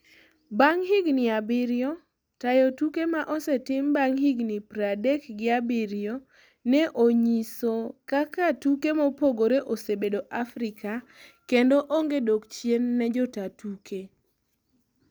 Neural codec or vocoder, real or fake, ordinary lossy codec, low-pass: none; real; none; none